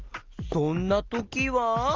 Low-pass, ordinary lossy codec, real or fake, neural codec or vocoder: 7.2 kHz; Opus, 24 kbps; real; none